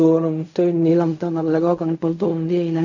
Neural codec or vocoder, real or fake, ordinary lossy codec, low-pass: codec, 16 kHz in and 24 kHz out, 0.4 kbps, LongCat-Audio-Codec, fine tuned four codebook decoder; fake; none; 7.2 kHz